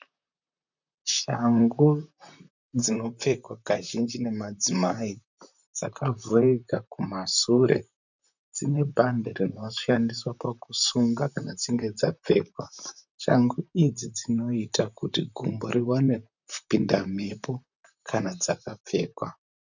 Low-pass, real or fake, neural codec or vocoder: 7.2 kHz; fake; vocoder, 44.1 kHz, 128 mel bands, Pupu-Vocoder